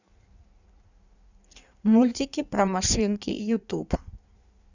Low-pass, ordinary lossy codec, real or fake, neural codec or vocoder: 7.2 kHz; none; fake; codec, 16 kHz in and 24 kHz out, 1.1 kbps, FireRedTTS-2 codec